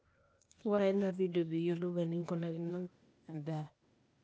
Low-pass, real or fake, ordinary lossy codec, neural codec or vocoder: none; fake; none; codec, 16 kHz, 0.8 kbps, ZipCodec